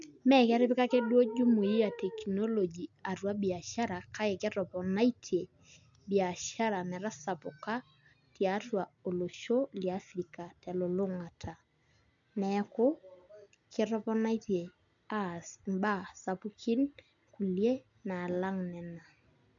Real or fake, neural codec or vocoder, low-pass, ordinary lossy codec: real; none; 7.2 kHz; none